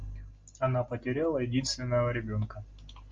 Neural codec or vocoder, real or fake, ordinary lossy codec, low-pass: none; real; Opus, 32 kbps; 7.2 kHz